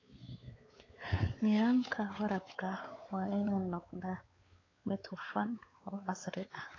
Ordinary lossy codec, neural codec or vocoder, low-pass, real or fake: AAC, 32 kbps; codec, 16 kHz, 4 kbps, X-Codec, WavLM features, trained on Multilingual LibriSpeech; 7.2 kHz; fake